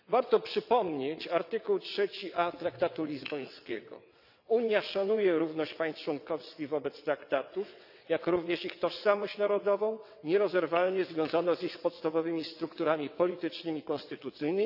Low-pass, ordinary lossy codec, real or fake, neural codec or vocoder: 5.4 kHz; none; fake; vocoder, 22.05 kHz, 80 mel bands, WaveNeXt